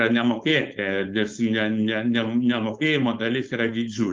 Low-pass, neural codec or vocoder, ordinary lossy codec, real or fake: 7.2 kHz; codec, 16 kHz, 4.8 kbps, FACodec; Opus, 32 kbps; fake